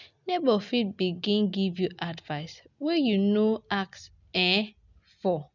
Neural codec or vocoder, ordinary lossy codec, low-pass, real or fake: none; none; 7.2 kHz; real